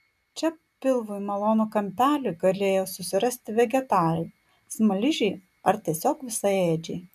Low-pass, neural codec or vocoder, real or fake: 14.4 kHz; none; real